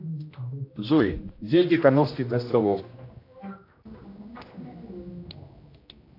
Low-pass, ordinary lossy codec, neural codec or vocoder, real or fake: 5.4 kHz; AAC, 32 kbps; codec, 16 kHz, 1 kbps, X-Codec, HuBERT features, trained on general audio; fake